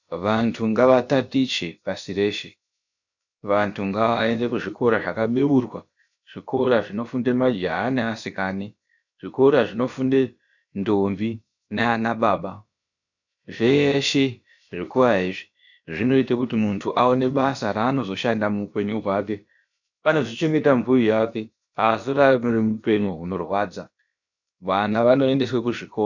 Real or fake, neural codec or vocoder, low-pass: fake; codec, 16 kHz, about 1 kbps, DyCAST, with the encoder's durations; 7.2 kHz